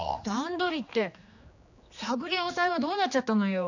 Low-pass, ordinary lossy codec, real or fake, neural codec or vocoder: 7.2 kHz; none; fake; codec, 16 kHz, 2 kbps, X-Codec, HuBERT features, trained on general audio